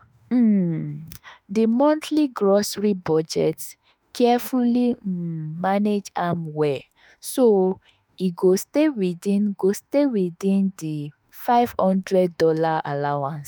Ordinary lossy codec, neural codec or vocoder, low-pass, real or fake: none; autoencoder, 48 kHz, 32 numbers a frame, DAC-VAE, trained on Japanese speech; none; fake